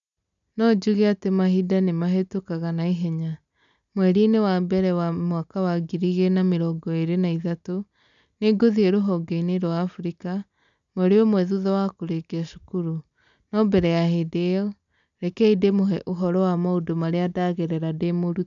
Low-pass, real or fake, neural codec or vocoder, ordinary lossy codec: 7.2 kHz; real; none; none